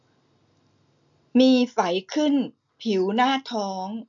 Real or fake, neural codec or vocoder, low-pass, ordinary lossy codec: real; none; 7.2 kHz; none